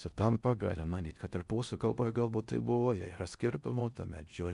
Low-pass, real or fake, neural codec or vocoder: 10.8 kHz; fake; codec, 16 kHz in and 24 kHz out, 0.6 kbps, FocalCodec, streaming, 4096 codes